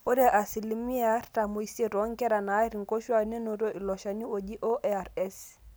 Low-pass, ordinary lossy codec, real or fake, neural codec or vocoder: none; none; real; none